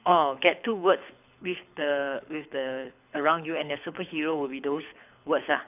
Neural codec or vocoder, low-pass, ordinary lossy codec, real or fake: codec, 24 kHz, 6 kbps, HILCodec; 3.6 kHz; none; fake